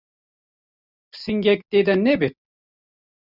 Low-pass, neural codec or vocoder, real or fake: 5.4 kHz; none; real